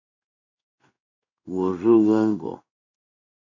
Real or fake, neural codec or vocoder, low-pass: fake; codec, 16 kHz in and 24 kHz out, 1 kbps, XY-Tokenizer; 7.2 kHz